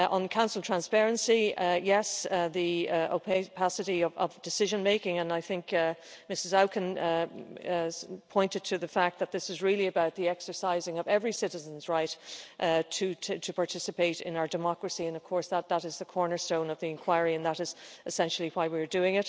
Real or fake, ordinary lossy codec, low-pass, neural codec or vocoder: real; none; none; none